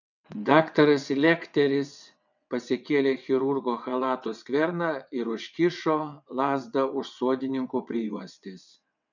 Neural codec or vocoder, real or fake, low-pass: vocoder, 22.05 kHz, 80 mel bands, WaveNeXt; fake; 7.2 kHz